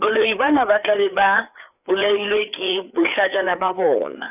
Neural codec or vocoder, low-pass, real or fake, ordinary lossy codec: codec, 16 kHz, 4 kbps, FreqCodec, larger model; 3.6 kHz; fake; none